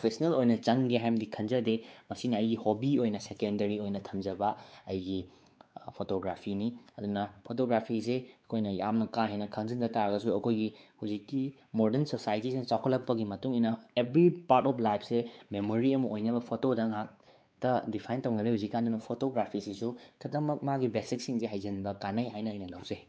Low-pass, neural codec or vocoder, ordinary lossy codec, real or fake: none; codec, 16 kHz, 4 kbps, X-Codec, WavLM features, trained on Multilingual LibriSpeech; none; fake